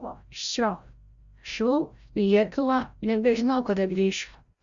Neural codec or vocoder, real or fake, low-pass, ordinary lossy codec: codec, 16 kHz, 0.5 kbps, FreqCodec, larger model; fake; 7.2 kHz; Opus, 64 kbps